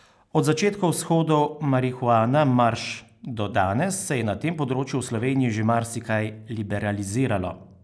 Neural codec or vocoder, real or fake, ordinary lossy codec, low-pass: none; real; none; none